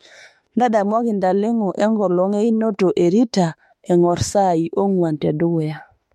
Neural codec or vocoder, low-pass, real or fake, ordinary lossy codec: autoencoder, 48 kHz, 32 numbers a frame, DAC-VAE, trained on Japanese speech; 19.8 kHz; fake; MP3, 64 kbps